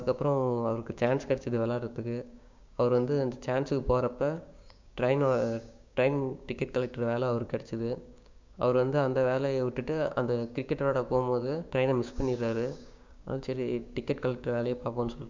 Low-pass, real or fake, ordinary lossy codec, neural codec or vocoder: 7.2 kHz; fake; MP3, 64 kbps; codec, 16 kHz, 6 kbps, DAC